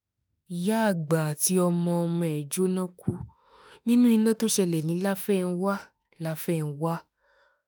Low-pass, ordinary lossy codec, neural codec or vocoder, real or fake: none; none; autoencoder, 48 kHz, 32 numbers a frame, DAC-VAE, trained on Japanese speech; fake